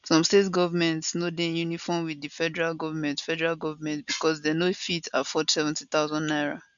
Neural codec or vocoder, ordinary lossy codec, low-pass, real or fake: none; none; 7.2 kHz; real